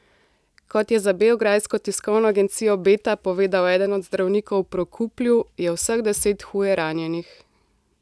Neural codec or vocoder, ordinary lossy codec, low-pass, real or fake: none; none; none; real